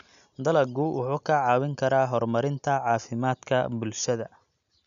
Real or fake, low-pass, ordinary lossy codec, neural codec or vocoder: real; 7.2 kHz; none; none